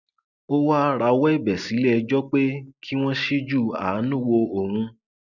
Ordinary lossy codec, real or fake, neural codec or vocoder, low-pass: none; real; none; 7.2 kHz